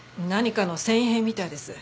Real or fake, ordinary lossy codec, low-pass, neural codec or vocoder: real; none; none; none